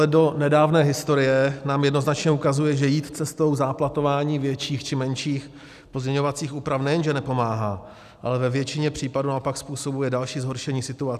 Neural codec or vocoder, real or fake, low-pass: none; real; 14.4 kHz